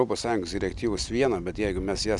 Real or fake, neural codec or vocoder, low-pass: real; none; 10.8 kHz